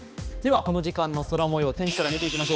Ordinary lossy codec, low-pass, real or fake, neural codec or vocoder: none; none; fake; codec, 16 kHz, 2 kbps, X-Codec, HuBERT features, trained on balanced general audio